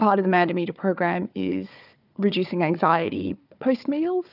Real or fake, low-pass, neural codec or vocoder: fake; 5.4 kHz; codec, 16 kHz, 4 kbps, FunCodec, trained on Chinese and English, 50 frames a second